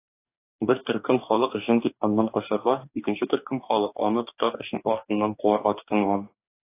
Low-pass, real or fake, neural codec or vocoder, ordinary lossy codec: 3.6 kHz; fake; codec, 44.1 kHz, 2.6 kbps, DAC; AAC, 24 kbps